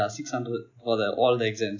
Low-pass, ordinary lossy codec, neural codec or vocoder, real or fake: 7.2 kHz; none; none; real